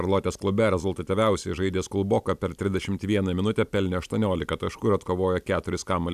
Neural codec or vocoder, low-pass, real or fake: none; 14.4 kHz; real